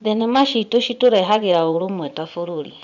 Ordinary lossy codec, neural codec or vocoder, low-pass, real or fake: none; vocoder, 24 kHz, 100 mel bands, Vocos; 7.2 kHz; fake